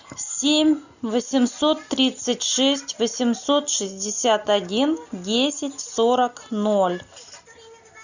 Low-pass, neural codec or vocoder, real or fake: 7.2 kHz; none; real